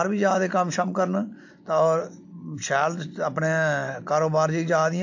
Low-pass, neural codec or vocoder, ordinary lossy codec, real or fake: 7.2 kHz; none; AAC, 48 kbps; real